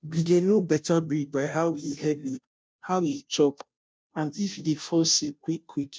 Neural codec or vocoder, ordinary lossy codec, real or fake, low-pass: codec, 16 kHz, 0.5 kbps, FunCodec, trained on Chinese and English, 25 frames a second; none; fake; none